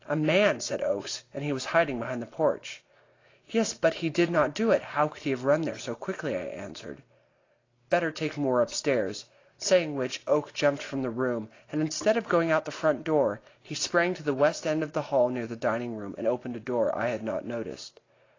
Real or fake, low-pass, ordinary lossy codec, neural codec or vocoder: real; 7.2 kHz; AAC, 32 kbps; none